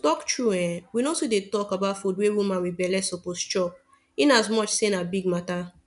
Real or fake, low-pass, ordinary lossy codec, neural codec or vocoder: real; 10.8 kHz; none; none